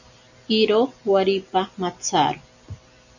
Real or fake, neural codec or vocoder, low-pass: real; none; 7.2 kHz